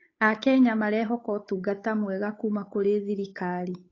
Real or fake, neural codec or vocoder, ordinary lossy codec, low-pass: fake; codec, 16 kHz, 8 kbps, FunCodec, trained on Chinese and English, 25 frames a second; AAC, 32 kbps; 7.2 kHz